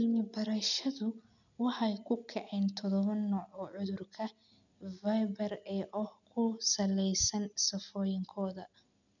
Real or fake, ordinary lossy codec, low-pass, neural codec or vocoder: real; none; 7.2 kHz; none